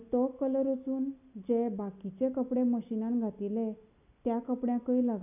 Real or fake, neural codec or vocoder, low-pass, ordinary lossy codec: real; none; 3.6 kHz; none